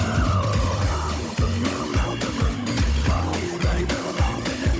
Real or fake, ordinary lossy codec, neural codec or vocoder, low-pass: fake; none; codec, 16 kHz, 16 kbps, FunCodec, trained on Chinese and English, 50 frames a second; none